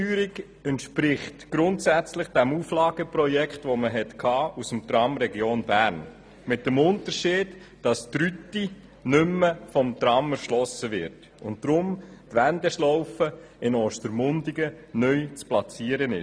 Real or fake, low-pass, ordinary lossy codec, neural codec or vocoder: real; none; none; none